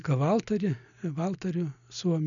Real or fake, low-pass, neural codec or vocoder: real; 7.2 kHz; none